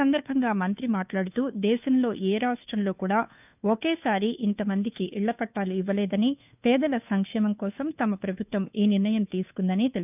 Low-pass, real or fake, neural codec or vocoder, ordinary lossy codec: 3.6 kHz; fake; codec, 16 kHz, 2 kbps, FunCodec, trained on Chinese and English, 25 frames a second; none